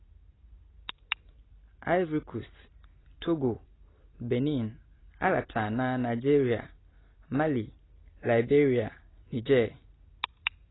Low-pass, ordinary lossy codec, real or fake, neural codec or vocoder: 7.2 kHz; AAC, 16 kbps; real; none